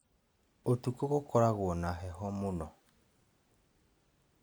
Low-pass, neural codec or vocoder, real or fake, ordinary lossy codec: none; none; real; none